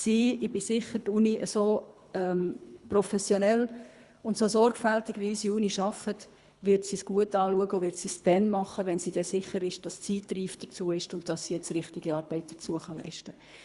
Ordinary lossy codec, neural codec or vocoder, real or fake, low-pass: Opus, 64 kbps; codec, 24 kHz, 3 kbps, HILCodec; fake; 10.8 kHz